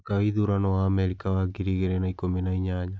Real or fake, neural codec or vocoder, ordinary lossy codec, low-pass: real; none; none; none